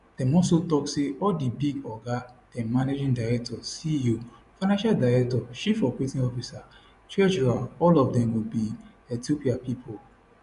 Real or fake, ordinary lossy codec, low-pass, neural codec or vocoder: fake; none; 10.8 kHz; vocoder, 24 kHz, 100 mel bands, Vocos